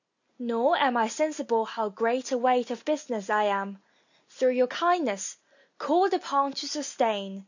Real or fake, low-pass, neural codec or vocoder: real; 7.2 kHz; none